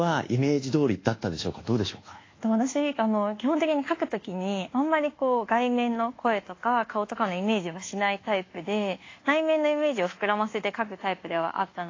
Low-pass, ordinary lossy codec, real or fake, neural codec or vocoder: 7.2 kHz; AAC, 32 kbps; fake; codec, 24 kHz, 1.2 kbps, DualCodec